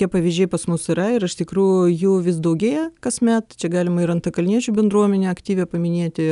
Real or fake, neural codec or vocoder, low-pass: real; none; 10.8 kHz